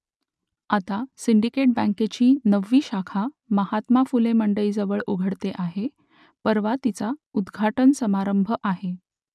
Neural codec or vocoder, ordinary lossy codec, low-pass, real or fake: none; none; none; real